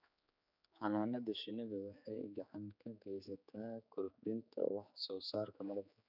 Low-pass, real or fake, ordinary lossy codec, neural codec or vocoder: 5.4 kHz; fake; none; codec, 16 kHz, 4 kbps, X-Codec, HuBERT features, trained on general audio